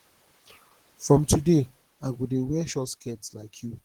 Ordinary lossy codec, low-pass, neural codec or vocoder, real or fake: Opus, 16 kbps; 19.8 kHz; vocoder, 44.1 kHz, 128 mel bands, Pupu-Vocoder; fake